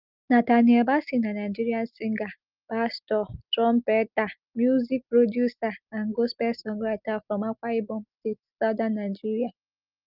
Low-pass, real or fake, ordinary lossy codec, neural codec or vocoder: 5.4 kHz; real; Opus, 24 kbps; none